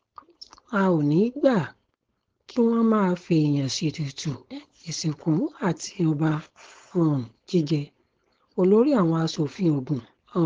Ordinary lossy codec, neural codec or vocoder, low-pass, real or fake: Opus, 16 kbps; codec, 16 kHz, 4.8 kbps, FACodec; 7.2 kHz; fake